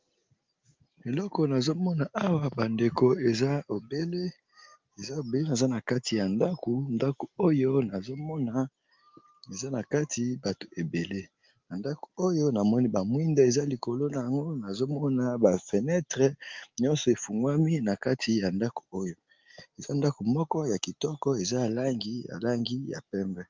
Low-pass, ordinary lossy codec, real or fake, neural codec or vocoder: 7.2 kHz; Opus, 32 kbps; real; none